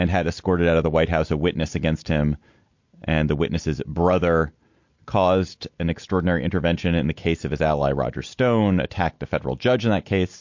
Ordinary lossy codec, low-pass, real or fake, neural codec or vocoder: MP3, 48 kbps; 7.2 kHz; real; none